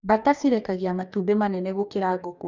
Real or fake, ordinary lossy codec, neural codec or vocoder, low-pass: fake; none; codec, 44.1 kHz, 2.6 kbps, DAC; 7.2 kHz